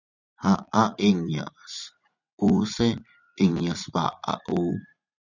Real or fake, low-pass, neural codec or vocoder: fake; 7.2 kHz; vocoder, 44.1 kHz, 80 mel bands, Vocos